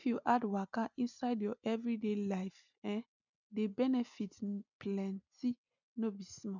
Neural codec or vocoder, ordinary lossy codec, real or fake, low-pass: none; none; real; 7.2 kHz